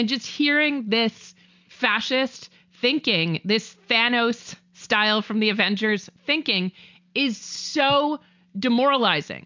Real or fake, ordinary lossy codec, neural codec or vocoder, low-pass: real; MP3, 64 kbps; none; 7.2 kHz